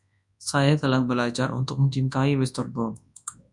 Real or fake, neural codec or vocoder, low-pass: fake; codec, 24 kHz, 0.9 kbps, WavTokenizer, large speech release; 10.8 kHz